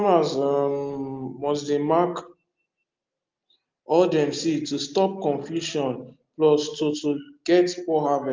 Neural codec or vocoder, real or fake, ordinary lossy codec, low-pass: none; real; Opus, 32 kbps; 7.2 kHz